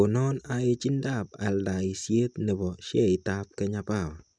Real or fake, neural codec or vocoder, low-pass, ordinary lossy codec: real; none; 9.9 kHz; none